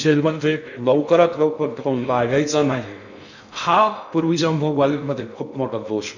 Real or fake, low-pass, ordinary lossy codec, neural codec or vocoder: fake; 7.2 kHz; none; codec, 16 kHz in and 24 kHz out, 0.6 kbps, FocalCodec, streaming, 2048 codes